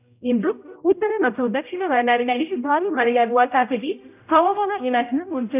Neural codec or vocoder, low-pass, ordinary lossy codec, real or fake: codec, 16 kHz, 0.5 kbps, X-Codec, HuBERT features, trained on general audio; 3.6 kHz; none; fake